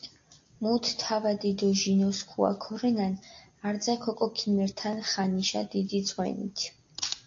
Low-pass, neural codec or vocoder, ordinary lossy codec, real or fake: 7.2 kHz; none; AAC, 48 kbps; real